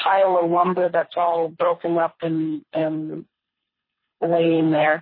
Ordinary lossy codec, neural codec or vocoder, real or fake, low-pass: MP3, 24 kbps; codec, 24 kHz, 3 kbps, HILCodec; fake; 5.4 kHz